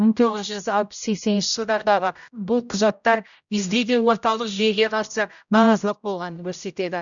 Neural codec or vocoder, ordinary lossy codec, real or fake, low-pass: codec, 16 kHz, 0.5 kbps, X-Codec, HuBERT features, trained on general audio; none; fake; 7.2 kHz